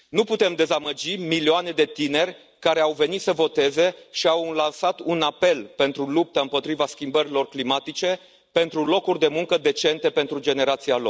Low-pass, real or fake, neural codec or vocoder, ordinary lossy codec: none; real; none; none